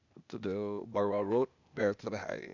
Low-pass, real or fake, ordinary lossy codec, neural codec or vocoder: 7.2 kHz; fake; none; codec, 16 kHz, 0.8 kbps, ZipCodec